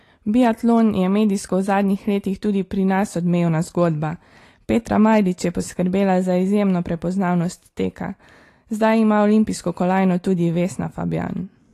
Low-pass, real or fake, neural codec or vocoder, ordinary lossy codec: 14.4 kHz; real; none; AAC, 48 kbps